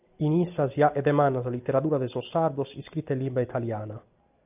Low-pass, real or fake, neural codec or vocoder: 3.6 kHz; real; none